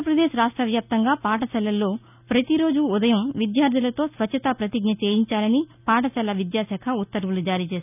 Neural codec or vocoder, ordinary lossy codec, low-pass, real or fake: none; none; 3.6 kHz; real